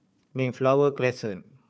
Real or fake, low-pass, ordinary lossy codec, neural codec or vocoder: fake; none; none; codec, 16 kHz, 4 kbps, FunCodec, trained on Chinese and English, 50 frames a second